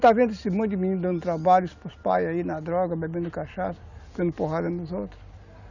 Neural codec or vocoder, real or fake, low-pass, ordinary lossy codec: none; real; 7.2 kHz; none